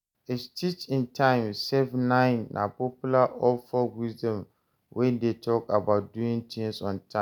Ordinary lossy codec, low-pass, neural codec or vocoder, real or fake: none; none; none; real